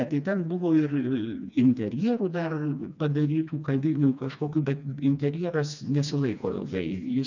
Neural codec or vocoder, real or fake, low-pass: codec, 16 kHz, 2 kbps, FreqCodec, smaller model; fake; 7.2 kHz